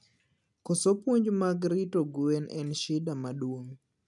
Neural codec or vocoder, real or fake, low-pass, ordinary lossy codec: none; real; 10.8 kHz; none